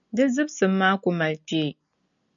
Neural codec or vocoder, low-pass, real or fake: none; 7.2 kHz; real